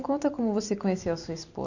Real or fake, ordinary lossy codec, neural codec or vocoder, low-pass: real; none; none; 7.2 kHz